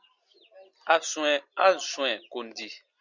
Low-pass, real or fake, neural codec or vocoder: 7.2 kHz; real; none